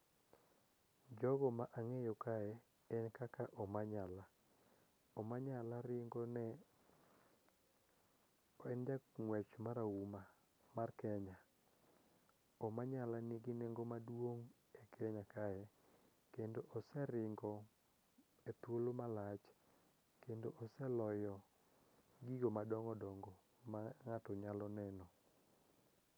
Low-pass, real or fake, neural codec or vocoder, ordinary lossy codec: none; real; none; none